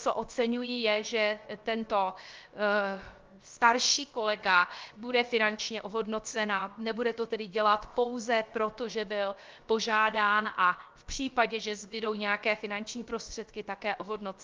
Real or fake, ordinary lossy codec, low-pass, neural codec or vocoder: fake; Opus, 24 kbps; 7.2 kHz; codec, 16 kHz, 0.7 kbps, FocalCodec